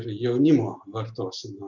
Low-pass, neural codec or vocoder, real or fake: 7.2 kHz; none; real